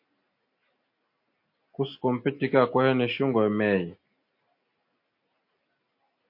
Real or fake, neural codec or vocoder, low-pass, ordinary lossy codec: real; none; 5.4 kHz; MP3, 32 kbps